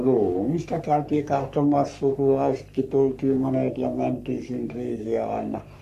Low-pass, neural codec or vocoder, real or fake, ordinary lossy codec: 14.4 kHz; codec, 44.1 kHz, 3.4 kbps, Pupu-Codec; fake; none